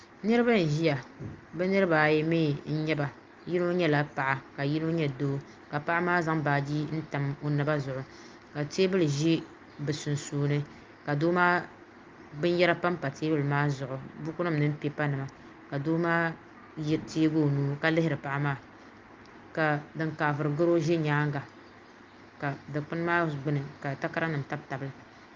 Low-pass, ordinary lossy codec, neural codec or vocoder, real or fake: 7.2 kHz; Opus, 32 kbps; none; real